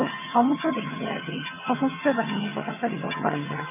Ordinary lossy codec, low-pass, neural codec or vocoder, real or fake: none; 3.6 kHz; vocoder, 22.05 kHz, 80 mel bands, HiFi-GAN; fake